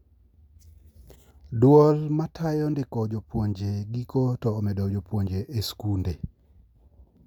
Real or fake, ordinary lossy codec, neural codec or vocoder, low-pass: real; none; none; 19.8 kHz